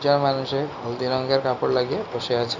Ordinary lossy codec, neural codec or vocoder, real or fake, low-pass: none; none; real; 7.2 kHz